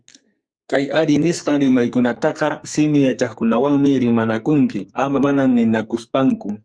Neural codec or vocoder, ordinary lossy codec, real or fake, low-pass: codec, 44.1 kHz, 2.6 kbps, SNAC; Opus, 64 kbps; fake; 9.9 kHz